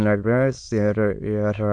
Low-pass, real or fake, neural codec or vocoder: 9.9 kHz; fake; autoencoder, 22.05 kHz, a latent of 192 numbers a frame, VITS, trained on many speakers